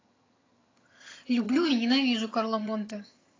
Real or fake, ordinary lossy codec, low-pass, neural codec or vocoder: fake; AAC, 32 kbps; 7.2 kHz; vocoder, 22.05 kHz, 80 mel bands, HiFi-GAN